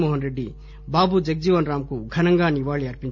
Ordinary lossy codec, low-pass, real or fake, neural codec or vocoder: none; 7.2 kHz; real; none